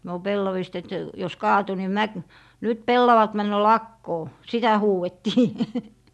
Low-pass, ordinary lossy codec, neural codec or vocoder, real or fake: none; none; none; real